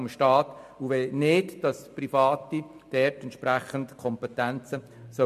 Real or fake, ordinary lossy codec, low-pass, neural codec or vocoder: real; MP3, 64 kbps; 14.4 kHz; none